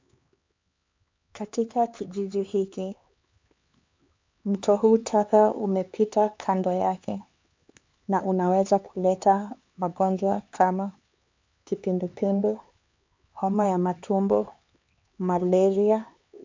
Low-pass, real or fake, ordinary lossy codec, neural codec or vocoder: 7.2 kHz; fake; MP3, 64 kbps; codec, 16 kHz, 4 kbps, X-Codec, HuBERT features, trained on LibriSpeech